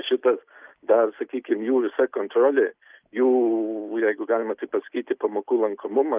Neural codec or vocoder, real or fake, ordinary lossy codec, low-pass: none; real; Opus, 24 kbps; 3.6 kHz